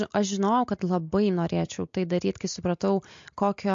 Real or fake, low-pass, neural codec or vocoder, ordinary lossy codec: real; 7.2 kHz; none; MP3, 48 kbps